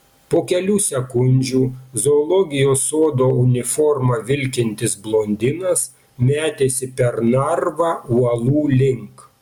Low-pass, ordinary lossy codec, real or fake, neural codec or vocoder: 19.8 kHz; MP3, 96 kbps; real; none